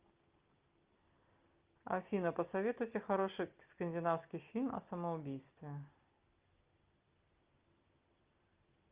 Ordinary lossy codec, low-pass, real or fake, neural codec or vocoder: Opus, 24 kbps; 3.6 kHz; real; none